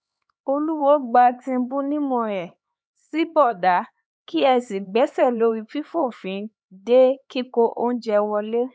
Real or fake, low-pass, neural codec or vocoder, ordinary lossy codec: fake; none; codec, 16 kHz, 4 kbps, X-Codec, HuBERT features, trained on LibriSpeech; none